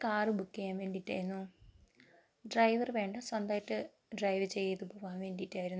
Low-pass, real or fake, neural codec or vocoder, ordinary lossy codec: none; real; none; none